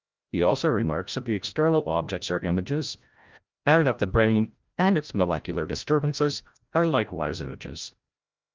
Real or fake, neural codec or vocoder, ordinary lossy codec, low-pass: fake; codec, 16 kHz, 0.5 kbps, FreqCodec, larger model; Opus, 24 kbps; 7.2 kHz